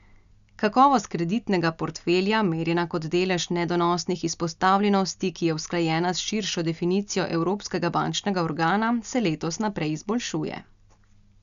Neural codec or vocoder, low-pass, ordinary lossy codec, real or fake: none; 7.2 kHz; none; real